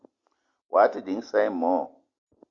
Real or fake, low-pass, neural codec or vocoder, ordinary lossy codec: real; 7.2 kHz; none; Opus, 64 kbps